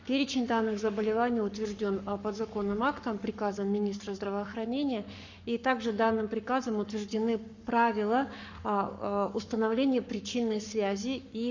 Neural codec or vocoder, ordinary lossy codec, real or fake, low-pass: codec, 44.1 kHz, 7.8 kbps, Pupu-Codec; none; fake; 7.2 kHz